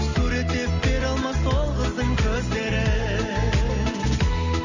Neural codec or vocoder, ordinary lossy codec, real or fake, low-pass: none; Opus, 64 kbps; real; 7.2 kHz